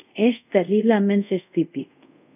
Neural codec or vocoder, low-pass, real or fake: codec, 24 kHz, 0.5 kbps, DualCodec; 3.6 kHz; fake